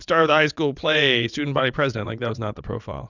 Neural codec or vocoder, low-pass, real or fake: vocoder, 22.05 kHz, 80 mel bands, WaveNeXt; 7.2 kHz; fake